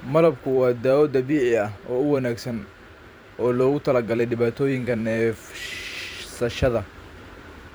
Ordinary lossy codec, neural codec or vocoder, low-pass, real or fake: none; vocoder, 44.1 kHz, 128 mel bands every 512 samples, BigVGAN v2; none; fake